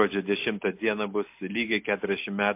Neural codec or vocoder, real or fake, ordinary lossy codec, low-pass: none; real; MP3, 32 kbps; 3.6 kHz